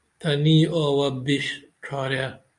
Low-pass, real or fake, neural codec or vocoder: 10.8 kHz; real; none